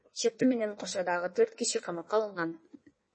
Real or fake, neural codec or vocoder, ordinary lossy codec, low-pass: fake; codec, 16 kHz in and 24 kHz out, 1.1 kbps, FireRedTTS-2 codec; MP3, 32 kbps; 9.9 kHz